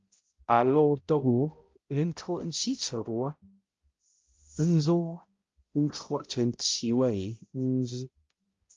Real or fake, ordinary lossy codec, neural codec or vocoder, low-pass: fake; Opus, 32 kbps; codec, 16 kHz, 0.5 kbps, X-Codec, HuBERT features, trained on balanced general audio; 7.2 kHz